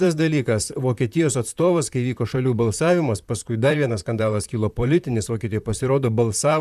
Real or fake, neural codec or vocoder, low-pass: fake; vocoder, 44.1 kHz, 128 mel bands, Pupu-Vocoder; 14.4 kHz